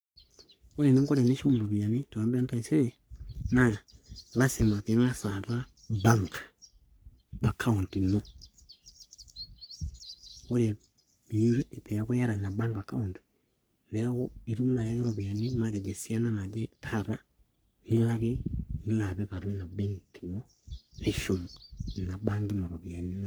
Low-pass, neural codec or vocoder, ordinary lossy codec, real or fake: none; codec, 44.1 kHz, 3.4 kbps, Pupu-Codec; none; fake